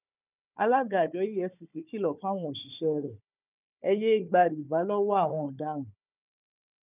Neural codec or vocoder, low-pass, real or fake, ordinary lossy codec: codec, 16 kHz, 4 kbps, FunCodec, trained on Chinese and English, 50 frames a second; 3.6 kHz; fake; none